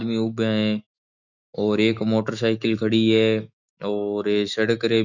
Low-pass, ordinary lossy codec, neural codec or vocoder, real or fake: 7.2 kHz; none; autoencoder, 48 kHz, 128 numbers a frame, DAC-VAE, trained on Japanese speech; fake